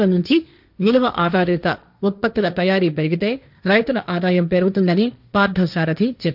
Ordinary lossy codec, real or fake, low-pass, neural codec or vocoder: none; fake; 5.4 kHz; codec, 16 kHz, 1.1 kbps, Voila-Tokenizer